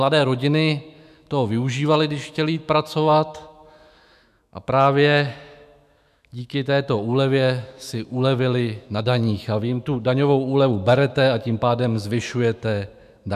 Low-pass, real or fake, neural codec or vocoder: 14.4 kHz; fake; autoencoder, 48 kHz, 128 numbers a frame, DAC-VAE, trained on Japanese speech